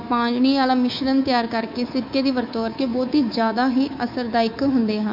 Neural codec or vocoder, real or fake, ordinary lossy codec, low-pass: codec, 24 kHz, 3.1 kbps, DualCodec; fake; none; 5.4 kHz